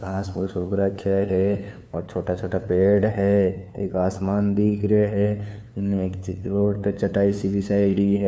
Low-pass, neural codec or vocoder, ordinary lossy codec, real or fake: none; codec, 16 kHz, 2 kbps, FunCodec, trained on LibriTTS, 25 frames a second; none; fake